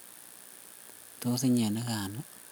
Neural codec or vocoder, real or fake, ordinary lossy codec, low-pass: none; real; none; none